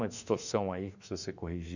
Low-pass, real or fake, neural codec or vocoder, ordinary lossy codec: 7.2 kHz; fake; autoencoder, 48 kHz, 32 numbers a frame, DAC-VAE, trained on Japanese speech; none